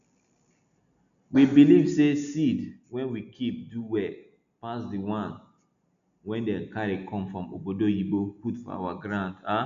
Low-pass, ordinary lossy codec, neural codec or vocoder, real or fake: 7.2 kHz; none; none; real